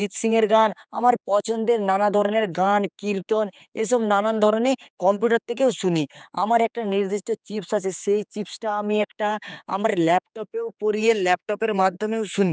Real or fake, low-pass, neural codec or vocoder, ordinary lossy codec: fake; none; codec, 16 kHz, 4 kbps, X-Codec, HuBERT features, trained on general audio; none